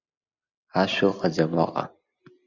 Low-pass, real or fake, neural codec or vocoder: 7.2 kHz; real; none